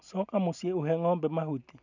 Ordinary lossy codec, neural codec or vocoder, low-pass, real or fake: none; none; 7.2 kHz; real